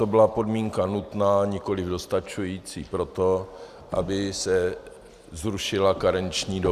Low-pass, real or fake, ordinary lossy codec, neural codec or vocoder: 14.4 kHz; real; Opus, 64 kbps; none